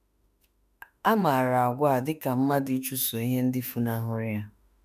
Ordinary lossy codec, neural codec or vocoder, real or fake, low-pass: none; autoencoder, 48 kHz, 32 numbers a frame, DAC-VAE, trained on Japanese speech; fake; 14.4 kHz